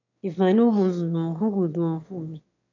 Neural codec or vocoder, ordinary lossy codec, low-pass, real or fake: autoencoder, 22.05 kHz, a latent of 192 numbers a frame, VITS, trained on one speaker; none; 7.2 kHz; fake